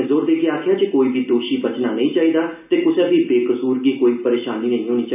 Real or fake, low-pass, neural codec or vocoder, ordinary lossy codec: real; 3.6 kHz; none; none